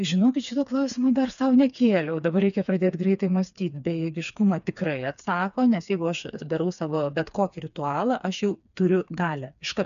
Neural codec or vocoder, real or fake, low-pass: codec, 16 kHz, 4 kbps, FreqCodec, smaller model; fake; 7.2 kHz